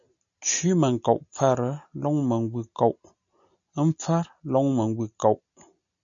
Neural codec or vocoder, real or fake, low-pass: none; real; 7.2 kHz